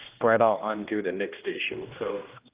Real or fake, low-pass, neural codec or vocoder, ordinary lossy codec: fake; 3.6 kHz; codec, 16 kHz, 1 kbps, X-Codec, HuBERT features, trained on general audio; Opus, 32 kbps